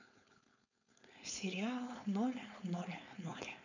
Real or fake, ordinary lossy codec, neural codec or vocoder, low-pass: fake; none; codec, 16 kHz, 4.8 kbps, FACodec; 7.2 kHz